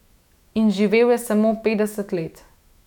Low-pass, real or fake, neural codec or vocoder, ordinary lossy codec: 19.8 kHz; fake; autoencoder, 48 kHz, 128 numbers a frame, DAC-VAE, trained on Japanese speech; none